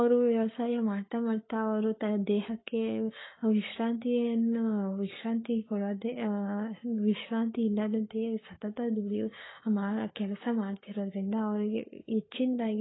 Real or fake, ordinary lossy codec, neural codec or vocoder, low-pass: fake; AAC, 16 kbps; codec, 44.1 kHz, 7.8 kbps, Pupu-Codec; 7.2 kHz